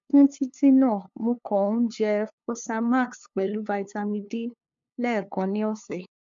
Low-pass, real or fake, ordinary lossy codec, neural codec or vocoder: 7.2 kHz; fake; MP3, 64 kbps; codec, 16 kHz, 2 kbps, FunCodec, trained on LibriTTS, 25 frames a second